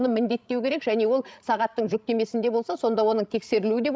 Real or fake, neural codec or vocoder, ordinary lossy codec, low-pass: fake; codec, 16 kHz, 16 kbps, FreqCodec, larger model; none; none